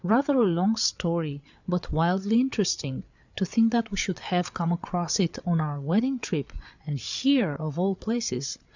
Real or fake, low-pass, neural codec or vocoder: fake; 7.2 kHz; codec, 16 kHz, 8 kbps, FreqCodec, larger model